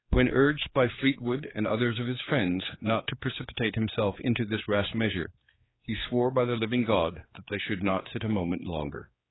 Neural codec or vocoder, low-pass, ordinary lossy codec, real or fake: codec, 16 kHz, 4 kbps, X-Codec, HuBERT features, trained on general audio; 7.2 kHz; AAC, 16 kbps; fake